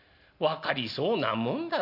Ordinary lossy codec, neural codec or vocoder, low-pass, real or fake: none; none; 5.4 kHz; real